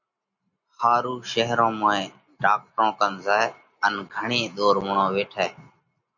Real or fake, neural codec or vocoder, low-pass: real; none; 7.2 kHz